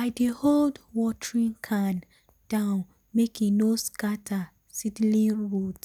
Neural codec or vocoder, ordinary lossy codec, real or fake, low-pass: none; none; real; none